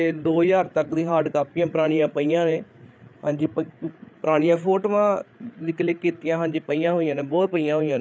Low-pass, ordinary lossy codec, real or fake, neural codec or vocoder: none; none; fake; codec, 16 kHz, 8 kbps, FreqCodec, larger model